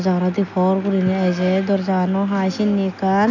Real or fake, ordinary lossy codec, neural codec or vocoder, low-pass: real; none; none; 7.2 kHz